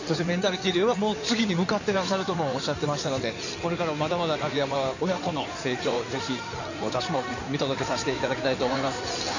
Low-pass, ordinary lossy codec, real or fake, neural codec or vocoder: 7.2 kHz; none; fake; codec, 16 kHz in and 24 kHz out, 2.2 kbps, FireRedTTS-2 codec